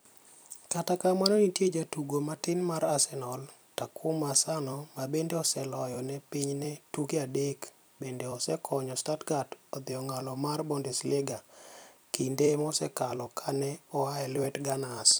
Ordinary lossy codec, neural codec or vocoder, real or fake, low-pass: none; vocoder, 44.1 kHz, 128 mel bands every 256 samples, BigVGAN v2; fake; none